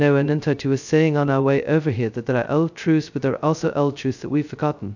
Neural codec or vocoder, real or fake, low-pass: codec, 16 kHz, 0.2 kbps, FocalCodec; fake; 7.2 kHz